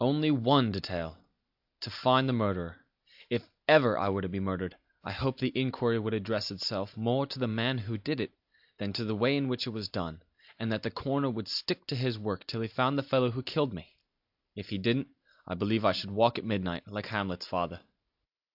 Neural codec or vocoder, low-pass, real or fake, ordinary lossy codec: none; 5.4 kHz; real; AAC, 48 kbps